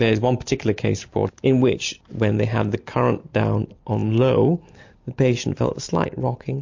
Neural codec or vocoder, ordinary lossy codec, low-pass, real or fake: none; MP3, 48 kbps; 7.2 kHz; real